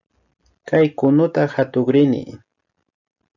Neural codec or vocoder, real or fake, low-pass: none; real; 7.2 kHz